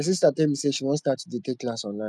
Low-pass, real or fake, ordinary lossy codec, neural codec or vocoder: none; real; none; none